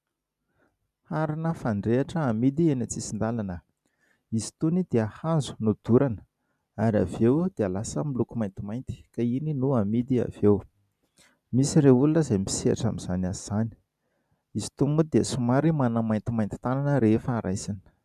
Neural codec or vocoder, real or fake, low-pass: none; real; 14.4 kHz